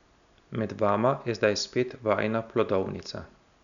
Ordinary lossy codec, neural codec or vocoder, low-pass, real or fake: none; none; 7.2 kHz; real